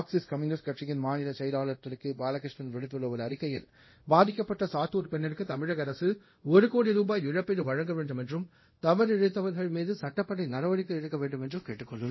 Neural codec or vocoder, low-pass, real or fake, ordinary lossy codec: codec, 24 kHz, 0.5 kbps, DualCodec; 7.2 kHz; fake; MP3, 24 kbps